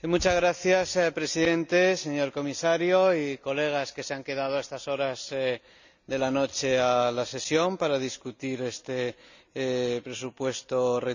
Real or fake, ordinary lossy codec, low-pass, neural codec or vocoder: real; none; 7.2 kHz; none